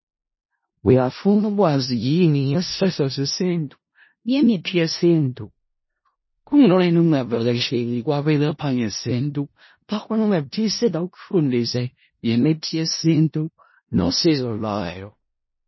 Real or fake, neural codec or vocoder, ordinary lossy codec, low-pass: fake; codec, 16 kHz in and 24 kHz out, 0.4 kbps, LongCat-Audio-Codec, four codebook decoder; MP3, 24 kbps; 7.2 kHz